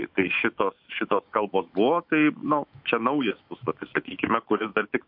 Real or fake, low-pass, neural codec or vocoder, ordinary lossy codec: fake; 5.4 kHz; autoencoder, 48 kHz, 128 numbers a frame, DAC-VAE, trained on Japanese speech; MP3, 48 kbps